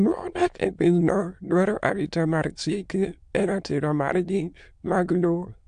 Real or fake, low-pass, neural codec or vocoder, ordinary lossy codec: fake; 9.9 kHz; autoencoder, 22.05 kHz, a latent of 192 numbers a frame, VITS, trained on many speakers; AAC, 64 kbps